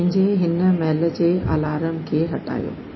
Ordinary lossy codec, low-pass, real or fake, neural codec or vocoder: MP3, 24 kbps; 7.2 kHz; real; none